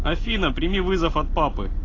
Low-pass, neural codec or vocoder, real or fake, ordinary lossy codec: 7.2 kHz; none; real; AAC, 32 kbps